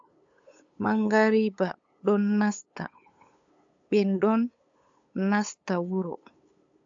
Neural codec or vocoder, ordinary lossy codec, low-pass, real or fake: codec, 16 kHz, 8 kbps, FunCodec, trained on LibriTTS, 25 frames a second; AAC, 64 kbps; 7.2 kHz; fake